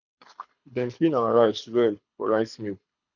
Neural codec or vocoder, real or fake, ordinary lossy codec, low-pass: codec, 24 kHz, 6 kbps, HILCodec; fake; none; 7.2 kHz